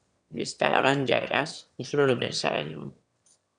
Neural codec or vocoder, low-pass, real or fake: autoencoder, 22.05 kHz, a latent of 192 numbers a frame, VITS, trained on one speaker; 9.9 kHz; fake